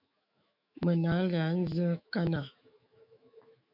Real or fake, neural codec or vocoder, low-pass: fake; autoencoder, 48 kHz, 128 numbers a frame, DAC-VAE, trained on Japanese speech; 5.4 kHz